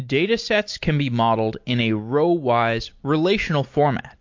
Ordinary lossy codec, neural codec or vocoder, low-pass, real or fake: MP3, 48 kbps; none; 7.2 kHz; real